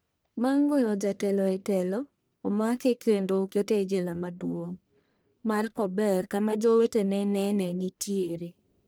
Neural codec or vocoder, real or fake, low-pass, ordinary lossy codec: codec, 44.1 kHz, 1.7 kbps, Pupu-Codec; fake; none; none